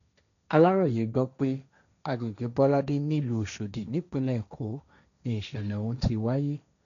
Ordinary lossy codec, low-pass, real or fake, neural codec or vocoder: none; 7.2 kHz; fake; codec, 16 kHz, 1.1 kbps, Voila-Tokenizer